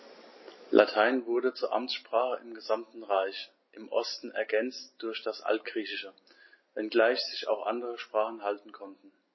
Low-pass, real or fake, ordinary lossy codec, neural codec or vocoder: 7.2 kHz; real; MP3, 24 kbps; none